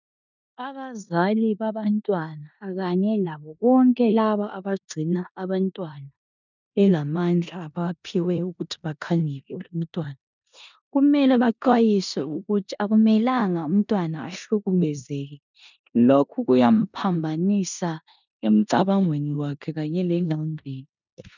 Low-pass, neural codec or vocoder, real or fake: 7.2 kHz; codec, 16 kHz in and 24 kHz out, 0.9 kbps, LongCat-Audio-Codec, four codebook decoder; fake